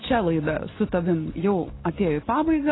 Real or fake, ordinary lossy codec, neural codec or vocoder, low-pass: real; AAC, 16 kbps; none; 7.2 kHz